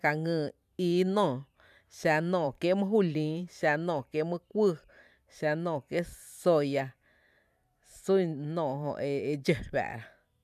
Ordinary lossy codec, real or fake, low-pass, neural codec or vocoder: none; fake; 14.4 kHz; vocoder, 44.1 kHz, 128 mel bands every 512 samples, BigVGAN v2